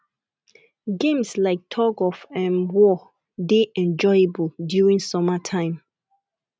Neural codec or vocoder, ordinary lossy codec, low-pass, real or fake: none; none; none; real